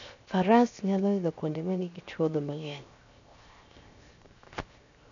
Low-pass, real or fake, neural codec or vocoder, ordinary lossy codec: 7.2 kHz; fake; codec, 16 kHz, 0.7 kbps, FocalCodec; none